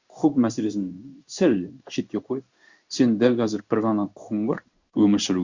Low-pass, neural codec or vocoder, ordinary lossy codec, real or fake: 7.2 kHz; codec, 16 kHz in and 24 kHz out, 1 kbps, XY-Tokenizer; Opus, 64 kbps; fake